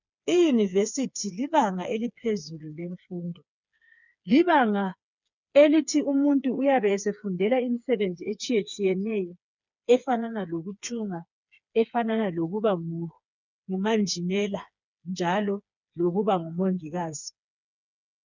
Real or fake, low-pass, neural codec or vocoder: fake; 7.2 kHz; codec, 16 kHz, 4 kbps, FreqCodec, smaller model